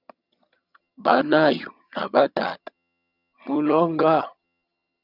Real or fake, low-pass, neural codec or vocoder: fake; 5.4 kHz; vocoder, 22.05 kHz, 80 mel bands, HiFi-GAN